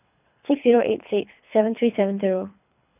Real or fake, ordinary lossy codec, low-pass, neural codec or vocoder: fake; none; 3.6 kHz; codec, 24 kHz, 3 kbps, HILCodec